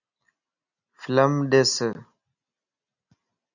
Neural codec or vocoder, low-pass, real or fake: none; 7.2 kHz; real